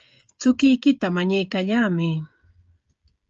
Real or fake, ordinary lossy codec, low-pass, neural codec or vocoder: fake; Opus, 24 kbps; 7.2 kHz; codec, 16 kHz, 16 kbps, FreqCodec, smaller model